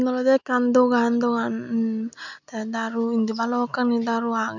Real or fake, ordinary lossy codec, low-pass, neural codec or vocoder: real; none; 7.2 kHz; none